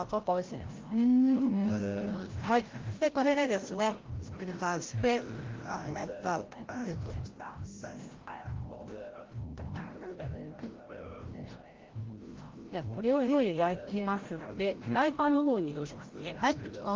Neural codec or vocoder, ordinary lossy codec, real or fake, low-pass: codec, 16 kHz, 0.5 kbps, FreqCodec, larger model; Opus, 16 kbps; fake; 7.2 kHz